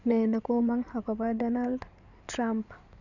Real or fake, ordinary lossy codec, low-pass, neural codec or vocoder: fake; none; 7.2 kHz; codec, 16 kHz in and 24 kHz out, 2.2 kbps, FireRedTTS-2 codec